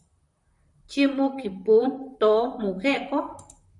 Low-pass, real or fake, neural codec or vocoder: 10.8 kHz; fake; vocoder, 44.1 kHz, 128 mel bands, Pupu-Vocoder